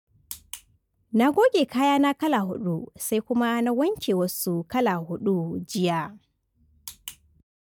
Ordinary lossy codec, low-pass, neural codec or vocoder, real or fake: none; 19.8 kHz; none; real